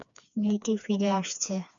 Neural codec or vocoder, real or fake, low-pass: codec, 16 kHz, 2 kbps, FreqCodec, smaller model; fake; 7.2 kHz